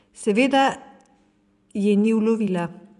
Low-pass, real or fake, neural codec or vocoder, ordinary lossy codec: 10.8 kHz; fake; vocoder, 24 kHz, 100 mel bands, Vocos; none